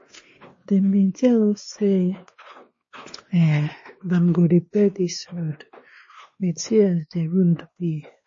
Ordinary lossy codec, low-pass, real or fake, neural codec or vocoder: MP3, 32 kbps; 7.2 kHz; fake; codec, 16 kHz, 2 kbps, X-Codec, WavLM features, trained on Multilingual LibriSpeech